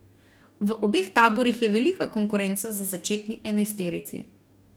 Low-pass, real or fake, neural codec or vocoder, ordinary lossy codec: none; fake; codec, 44.1 kHz, 2.6 kbps, DAC; none